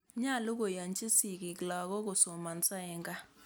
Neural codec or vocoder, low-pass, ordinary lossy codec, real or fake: none; none; none; real